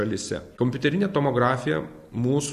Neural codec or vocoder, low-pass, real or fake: none; 14.4 kHz; real